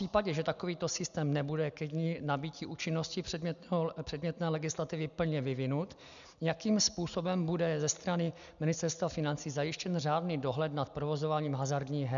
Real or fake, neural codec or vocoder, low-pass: real; none; 7.2 kHz